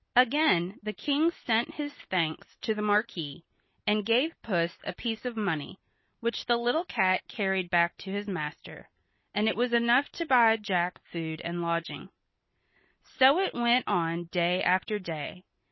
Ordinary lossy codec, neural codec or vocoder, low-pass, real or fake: MP3, 24 kbps; none; 7.2 kHz; real